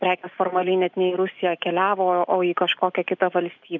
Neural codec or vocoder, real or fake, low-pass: none; real; 7.2 kHz